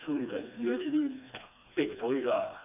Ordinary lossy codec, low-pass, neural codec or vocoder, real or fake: none; 3.6 kHz; codec, 16 kHz, 2 kbps, FreqCodec, smaller model; fake